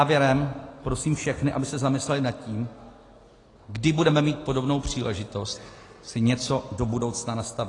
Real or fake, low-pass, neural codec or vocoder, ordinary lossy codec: real; 10.8 kHz; none; AAC, 32 kbps